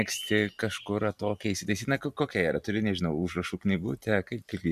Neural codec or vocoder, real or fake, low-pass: none; real; 14.4 kHz